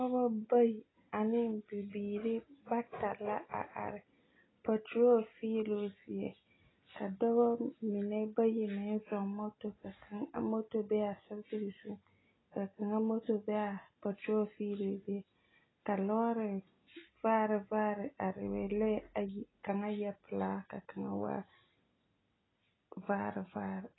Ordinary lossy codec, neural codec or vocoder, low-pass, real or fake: AAC, 16 kbps; none; 7.2 kHz; real